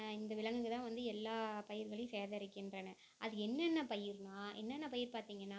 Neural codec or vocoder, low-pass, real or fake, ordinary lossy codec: none; none; real; none